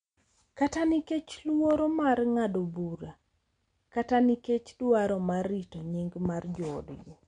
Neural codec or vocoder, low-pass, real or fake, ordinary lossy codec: none; 9.9 kHz; real; MP3, 64 kbps